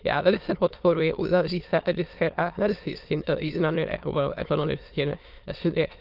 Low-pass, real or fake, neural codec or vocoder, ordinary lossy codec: 5.4 kHz; fake; autoencoder, 22.05 kHz, a latent of 192 numbers a frame, VITS, trained on many speakers; Opus, 24 kbps